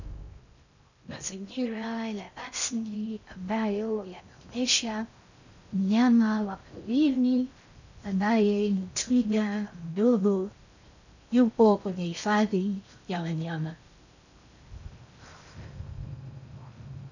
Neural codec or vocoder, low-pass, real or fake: codec, 16 kHz in and 24 kHz out, 0.6 kbps, FocalCodec, streaming, 4096 codes; 7.2 kHz; fake